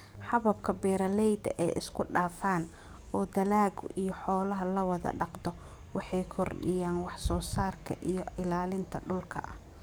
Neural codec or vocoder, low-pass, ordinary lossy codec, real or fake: codec, 44.1 kHz, 7.8 kbps, DAC; none; none; fake